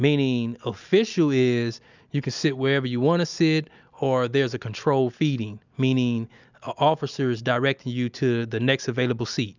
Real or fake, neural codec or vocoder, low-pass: real; none; 7.2 kHz